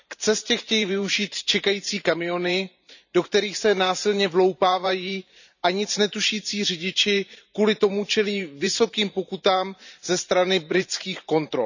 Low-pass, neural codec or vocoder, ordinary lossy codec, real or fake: 7.2 kHz; vocoder, 44.1 kHz, 128 mel bands every 512 samples, BigVGAN v2; none; fake